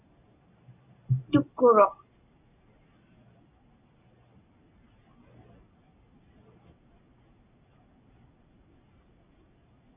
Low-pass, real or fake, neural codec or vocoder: 3.6 kHz; real; none